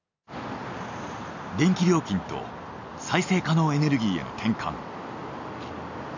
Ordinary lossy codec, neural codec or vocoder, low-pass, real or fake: none; none; 7.2 kHz; real